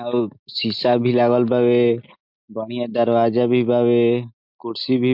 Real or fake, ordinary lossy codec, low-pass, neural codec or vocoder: real; MP3, 48 kbps; 5.4 kHz; none